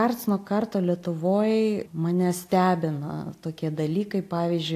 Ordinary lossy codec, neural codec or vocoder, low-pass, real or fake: AAC, 64 kbps; none; 14.4 kHz; real